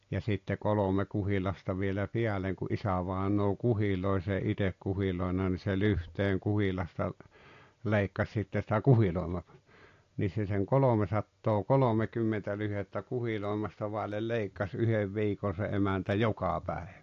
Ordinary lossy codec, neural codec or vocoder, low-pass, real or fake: AAC, 48 kbps; none; 7.2 kHz; real